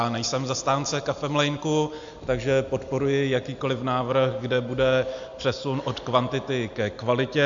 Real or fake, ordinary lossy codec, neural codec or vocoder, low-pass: real; AAC, 64 kbps; none; 7.2 kHz